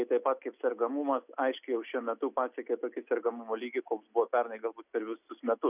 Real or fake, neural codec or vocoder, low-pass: real; none; 3.6 kHz